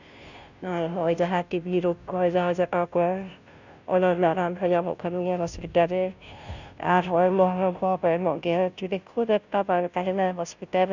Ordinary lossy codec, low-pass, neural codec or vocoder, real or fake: none; 7.2 kHz; codec, 16 kHz, 0.5 kbps, FunCodec, trained on Chinese and English, 25 frames a second; fake